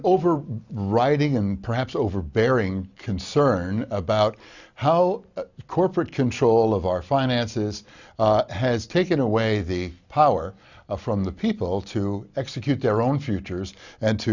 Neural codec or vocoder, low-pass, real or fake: none; 7.2 kHz; real